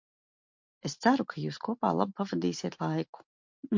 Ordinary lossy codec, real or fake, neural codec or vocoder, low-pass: MP3, 48 kbps; real; none; 7.2 kHz